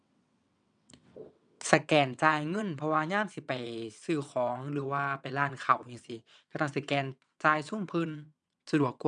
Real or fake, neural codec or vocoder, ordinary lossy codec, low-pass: fake; vocoder, 22.05 kHz, 80 mel bands, WaveNeXt; none; 9.9 kHz